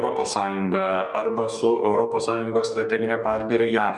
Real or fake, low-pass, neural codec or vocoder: fake; 10.8 kHz; codec, 44.1 kHz, 2.6 kbps, DAC